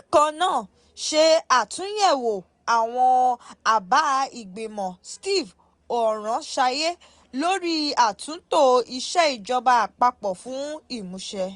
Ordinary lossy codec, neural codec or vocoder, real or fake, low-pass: Opus, 64 kbps; none; real; 10.8 kHz